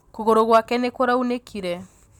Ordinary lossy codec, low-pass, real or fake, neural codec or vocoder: none; 19.8 kHz; real; none